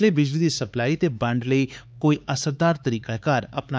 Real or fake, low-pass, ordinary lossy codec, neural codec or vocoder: fake; none; none; codec, 16 kHz, 4 kbps, X-Codec, HuBERT features, trained on LibriSpeech